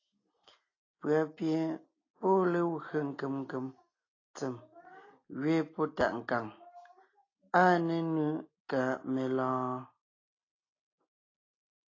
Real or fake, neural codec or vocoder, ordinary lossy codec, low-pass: real; none; AAC, 32 kbps; 7.2 kHz